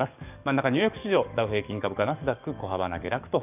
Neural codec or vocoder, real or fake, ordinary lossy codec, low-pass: codec, 44.1 kHz, 7.8 kbps, DAC; fake; none; 3.6 kHz